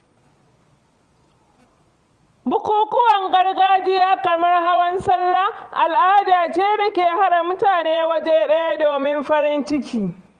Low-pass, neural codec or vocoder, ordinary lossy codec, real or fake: 9.9 kHz; vocoder, 22.05 kHz, 80 mel bands, Vocos; Opus, 32 kbps; fake